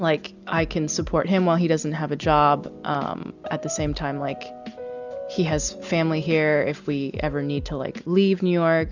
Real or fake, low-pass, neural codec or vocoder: real; 7.2 kHz; none